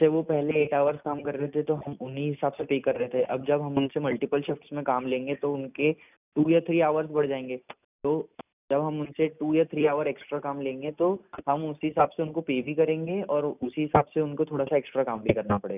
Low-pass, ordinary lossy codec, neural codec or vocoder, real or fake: 3.6 kHz; none; none; real